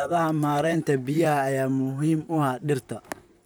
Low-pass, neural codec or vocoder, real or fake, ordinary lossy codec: none; vocoder, 44.1 kHz, 128 mel bands, Pupu-Vocoder; fake; none